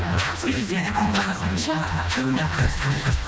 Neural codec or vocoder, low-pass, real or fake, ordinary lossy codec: codec, 16 kHz, 1 kbps, FreqCodec, smaller model; none; fake; none